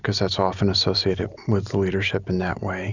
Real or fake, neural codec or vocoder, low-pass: real; none; 7.2 kHz